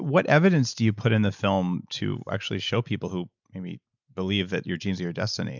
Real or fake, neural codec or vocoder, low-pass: real; none; 7.2 kHz